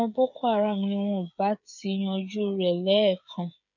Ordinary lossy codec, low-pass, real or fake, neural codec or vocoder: none; 7.2 kHz; fake; codec, 16 kHz, 8 kbps, FreqCodec, smaller model